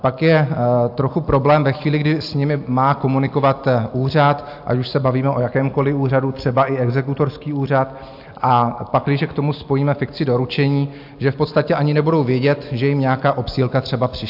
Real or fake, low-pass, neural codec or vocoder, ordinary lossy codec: real; 5.4 kHz; none; AAC, 48 kbps